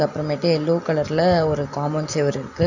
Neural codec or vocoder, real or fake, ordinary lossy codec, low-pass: none; real; none; 7.2 kHz